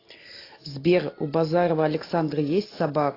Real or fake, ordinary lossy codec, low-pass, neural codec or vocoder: real; AAC, 24 kbps; 5.4 kHz; none